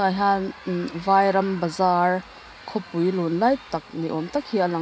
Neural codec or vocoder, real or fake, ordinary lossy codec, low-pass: none; real; none; none